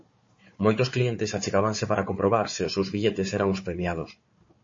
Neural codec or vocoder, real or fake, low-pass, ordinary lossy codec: codec, 16 kHz, 4 kbps, FunCodec, trained on Chinese and English, 50 frames a second; fake; 7.2 kHz; MP3, 32 kbps